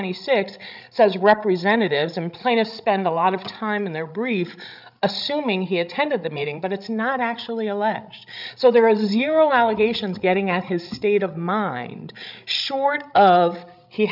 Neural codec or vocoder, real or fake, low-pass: codec, 16 kHz, 16 kbps, FreqCodec, larger model; fake; 5.4 kHz